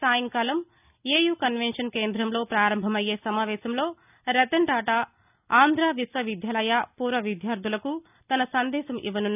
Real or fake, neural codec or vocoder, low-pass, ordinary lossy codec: real; none; 3.6 kHz; none